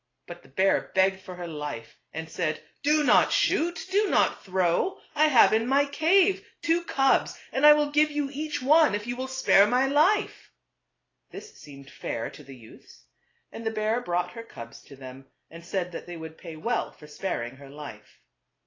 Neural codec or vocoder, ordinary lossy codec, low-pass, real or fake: none; AAC, 32 kbps; 7.2 kHz; real